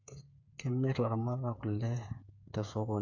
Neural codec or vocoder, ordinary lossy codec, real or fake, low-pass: codec, 16 kHz, 8 kbps, FreqCodec, smaller model; none; fake; 7.2 kHz